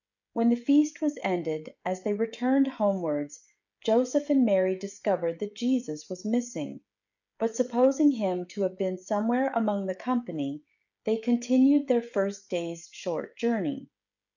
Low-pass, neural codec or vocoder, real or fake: 7.2 kHz; codec, 16 kHz, 16 kbps, FreqCodec, smaller model; fake